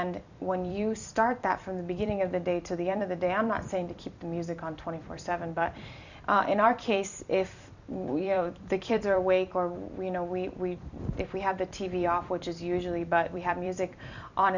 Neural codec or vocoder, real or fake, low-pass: vocoder, 44.1 kHz, 128 mel bands every 256 samples, BigVGAN v2; fake; 7.2 kHz